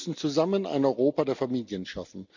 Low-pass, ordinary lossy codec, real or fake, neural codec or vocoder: 7.2 kHz; none; real; none